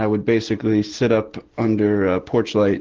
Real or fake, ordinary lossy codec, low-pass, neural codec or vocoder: real; Opus, 16 kbps; 7.2 kHz; none